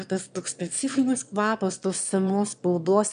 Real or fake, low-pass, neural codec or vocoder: fake; 9.9 kHz; autoencoder, 22.05 kHz, a latent of 192 numbers a frame, VITS, trained on one speaker